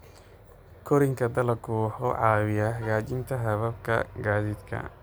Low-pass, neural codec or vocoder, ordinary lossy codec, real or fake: none; none; none; real